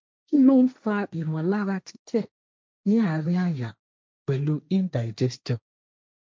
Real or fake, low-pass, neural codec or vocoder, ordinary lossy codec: fake; none; codec, 16 kHz, 1.1 kbps, Voila-Tokenizer; none